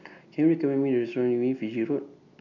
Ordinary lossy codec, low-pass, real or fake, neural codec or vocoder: none; 7.2 kHz; real; none